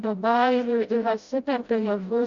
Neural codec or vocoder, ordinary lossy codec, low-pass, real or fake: codec, 16 kHz, 0.5 kbps, FreqCodec, smaller model; Opus, 64 kbps; 7.2 kHz; fake